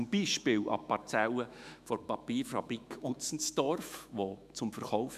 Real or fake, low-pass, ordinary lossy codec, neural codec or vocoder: fake; 14.4 kHz; MP3, 96 kbps; autoencoder, 48 kHz, 128 numbers a frame, DAC-VAE, trained on Japanese speech